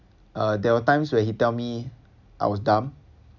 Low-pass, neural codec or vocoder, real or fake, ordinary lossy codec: 7.2 kHz; none; real; none